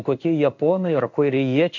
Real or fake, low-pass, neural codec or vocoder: fake; 7.2 kHz; codec, 16 kHz in and 24 kHz out, 1 kbps, XY-Tokenizer